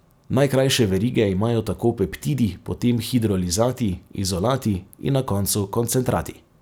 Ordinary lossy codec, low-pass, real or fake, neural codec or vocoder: none; none; real; none